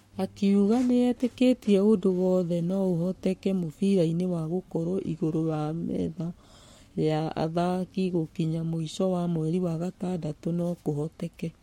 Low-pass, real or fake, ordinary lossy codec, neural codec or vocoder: 19.8 kHz; fake; MP3, 64 kbps; codec, 44.1 kHz, 7.8 kbps, Pupu-Codec